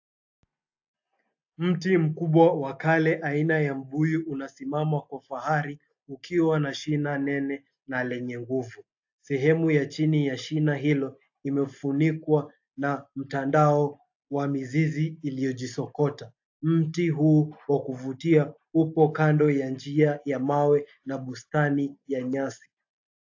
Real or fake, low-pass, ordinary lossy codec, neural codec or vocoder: real; 7.2 kHz; AAC, 48 kbps; none